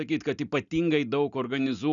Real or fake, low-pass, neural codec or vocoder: real; 7.2 kHz; none